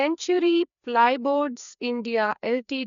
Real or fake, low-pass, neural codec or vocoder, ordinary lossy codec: fake; 7.2 kHz; codec, 16 kHz, 2 kbps, FreqCodec, larger model; none